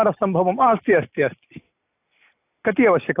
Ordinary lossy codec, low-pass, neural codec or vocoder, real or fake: AAC, 24 kbps; 3.6 kHz; none; real